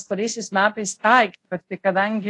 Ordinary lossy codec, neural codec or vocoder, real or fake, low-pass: AAC, 48 kbps; codec, 24 kHz, 0.5 kbps, DualCodec; fake; 10.8 kHz